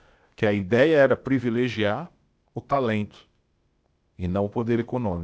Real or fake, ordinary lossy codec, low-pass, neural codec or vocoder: fake; none; none; codec, 16 kHz, 0.8 kbps, ZipCodec